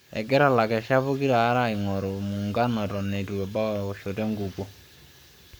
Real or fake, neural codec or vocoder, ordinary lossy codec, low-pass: fake; codec, 44.1 kHz, 7.8 kbps, Pupu-Codec; none; none